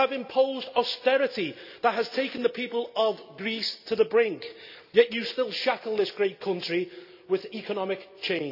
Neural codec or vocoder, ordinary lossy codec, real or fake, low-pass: none; none; real; 5.4 kHz